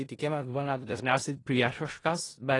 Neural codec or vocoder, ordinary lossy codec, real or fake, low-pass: codec, 16 kHz in and 24 kHz out, 0.4 kbps, LongCat-Audio-Codec, four codebook decoder; AAC, 32 kbps; fake; 10.8 kHz